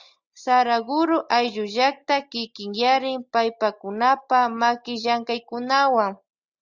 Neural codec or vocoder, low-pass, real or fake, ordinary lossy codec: none; 7.2 kHz; real; Opus, 64 kbps